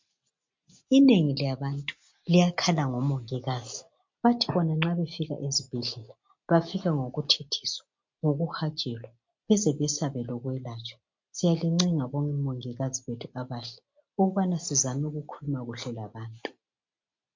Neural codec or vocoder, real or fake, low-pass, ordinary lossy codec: none; real; 7.2 kHz; MP3, 48 kbps